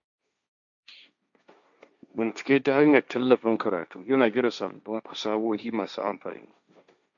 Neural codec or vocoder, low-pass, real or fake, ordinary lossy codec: codec, 16 kHz, 1.1 kbps, Voila-Tokenizer; 7.2 kHz; fake; none